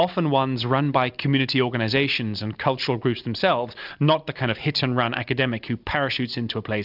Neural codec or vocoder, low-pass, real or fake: none; 5.4 kHz; real